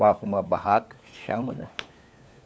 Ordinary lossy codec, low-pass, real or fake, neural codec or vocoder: none; none; fake; codec, 16 kHz, 4 kbps, FunCodec, trained on LibriTTS, 50 frames a second